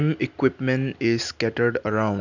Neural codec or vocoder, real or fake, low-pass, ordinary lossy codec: none; real; 7.2 kHz; none